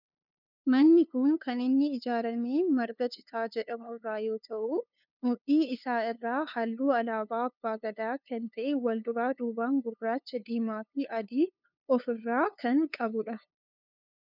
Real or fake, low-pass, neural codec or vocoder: fake; 5.4 kHz; codec, 16 kHz, 2 kbps, FunCodec, trained on LibriTTS, 25 frames a second